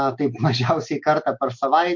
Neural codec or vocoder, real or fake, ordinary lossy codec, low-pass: none; real; MP3, 48 kbps; 7.2 kHz